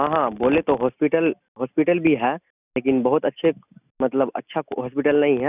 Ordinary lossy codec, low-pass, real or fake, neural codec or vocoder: none; 3.6 kHz; real; none